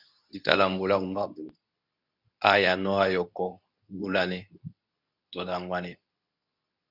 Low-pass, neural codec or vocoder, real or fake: 5.4 kHz; codec, 24 kHz, 0.9 kbps, WavTokenizer, medium speech release version 2; fake